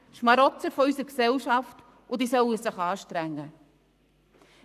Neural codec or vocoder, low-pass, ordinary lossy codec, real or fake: codec, 44.1 kHz, 7.8 kbps, Pupu-Codec; 14.4 kHz; none; fake